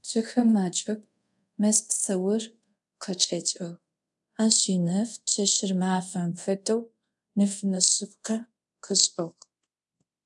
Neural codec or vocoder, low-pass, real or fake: codec, 24 kHz, 0.5 kbps, DualCodec; 10.8 kHz; fake